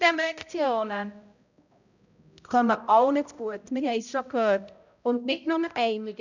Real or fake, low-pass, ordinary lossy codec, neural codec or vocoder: fake; 7.2 kHz; none; codec, 16 kHz, 0.5 kbps, X-Codec, HuBERT features, trained on balanced general audio